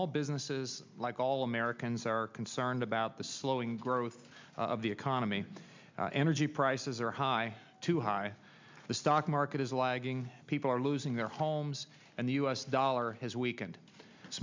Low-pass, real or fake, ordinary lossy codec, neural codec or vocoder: 7.2 kHz; real; MP3, 64 kbps; none